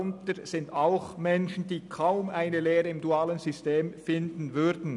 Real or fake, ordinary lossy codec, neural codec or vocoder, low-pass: real; none; none; 10.8 kHz